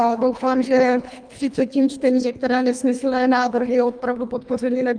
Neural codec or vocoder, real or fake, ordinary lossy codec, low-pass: codec, 24 kHz, 1.5 kbps, HILCodec; fake; Opus, 32 kbps; 9.9 kHz